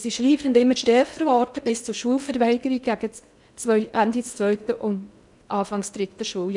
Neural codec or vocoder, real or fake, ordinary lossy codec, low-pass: codec, 16 kHz in and 24 kHz out, 0.6 kbps, FocalCodec, streaming, 2048 codes; fake; none; 10.8 kHz